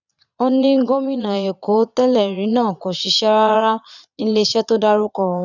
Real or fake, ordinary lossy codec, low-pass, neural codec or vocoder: fake; none; 7.2 kHz; vocoder, 22.05 kHz, 80 mel bands, Vocos